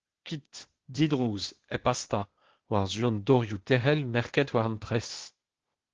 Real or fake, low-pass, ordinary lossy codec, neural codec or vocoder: fake; 7.2 kHz; Opus, 16 kbps; codec, 16 kHz, 0.8 kbps, ZipCodec